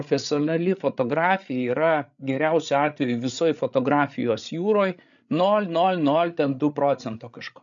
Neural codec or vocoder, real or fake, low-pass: codec, 16 kHz, 8 kbps, FreqCodec, larger model; fake; 7.2 kHz